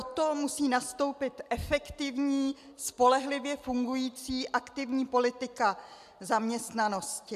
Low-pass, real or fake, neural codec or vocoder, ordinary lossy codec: 14.4 kHz; real; none; AAC, 96 kbps